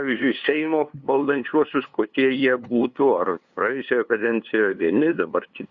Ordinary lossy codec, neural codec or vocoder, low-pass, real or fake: Opus, 64 kbps; codec, 16 kHz, 2 kbps, FunCodec, trained on LibriTTS, 25 frames a second; 7.2 kHz; fake